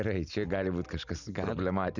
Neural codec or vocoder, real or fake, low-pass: none; real; 7.2 kHz